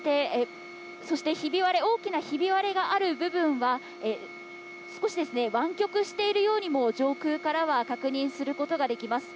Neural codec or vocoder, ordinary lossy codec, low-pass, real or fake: none; none; none; real